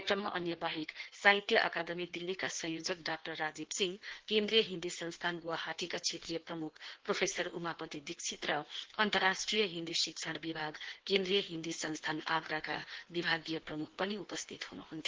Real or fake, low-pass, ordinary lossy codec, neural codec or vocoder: fake; 7.2 kHz; Opus, 16 kbps; codec, 16 kHz in and 24 kHz out, 1.1 kbps, FireRedTTS-2 codec